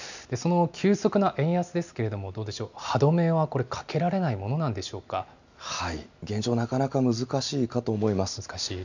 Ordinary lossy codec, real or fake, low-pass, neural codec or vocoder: none; real; 7.2 kHz; none